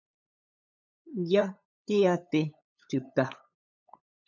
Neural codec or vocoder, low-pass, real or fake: codec, 16 kHz, 8 kbps, FunCodec, trained on LibriTTS, 25 frames a second; 7.2 kHz; fake